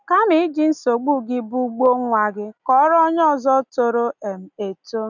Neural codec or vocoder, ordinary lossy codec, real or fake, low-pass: none; none; real; 7.2 kHz